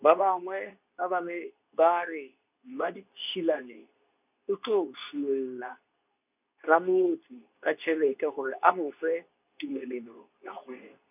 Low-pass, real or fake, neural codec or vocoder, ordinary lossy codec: 3.6 kHz; fake; codec, 24 kHz, 0.9 kbps, WavTokenizer, medium speech release version 2; none